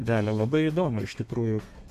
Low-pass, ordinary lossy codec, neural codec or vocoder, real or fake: 14.4 kHz; AAC, 96 kbps; codec, 32 kHz, 1.9 kbps, SNAC; fake